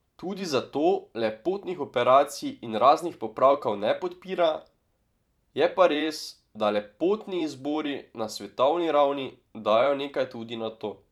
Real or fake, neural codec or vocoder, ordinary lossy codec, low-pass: fake; vocoder, 44.1 kHz, 128 mel bands every 512 samples, BigVGAN v2; none; 19.8 kHz